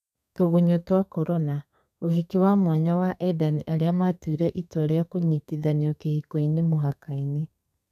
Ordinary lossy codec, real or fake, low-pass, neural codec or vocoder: none; fake; 14.4 kHz; codec, 32 kHz, 1.9 kbps, SNAC